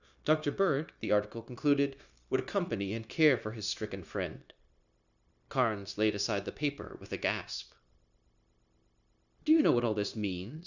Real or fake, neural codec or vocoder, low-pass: fake; codec, 16 kHz, 0.9 kbps, LongCat-Audio-Codec; 7.2 kHz